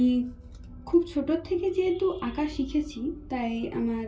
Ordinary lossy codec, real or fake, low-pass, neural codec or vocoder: none; real; none; none